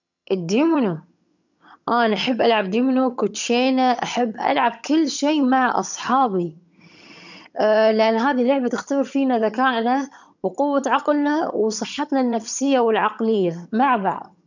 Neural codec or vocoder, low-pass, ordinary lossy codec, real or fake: vocoder, 22.05 kHz, 80 mel bands, HiFi-GAN; 7.2 kHz; none; fake